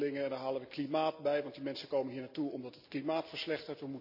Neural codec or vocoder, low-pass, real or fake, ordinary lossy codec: none; 5.4 kHz; real; none